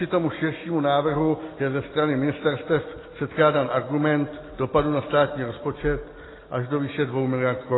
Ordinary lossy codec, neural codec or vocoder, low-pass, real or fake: AAC, 16 kbps; none; 7.2 kHz; real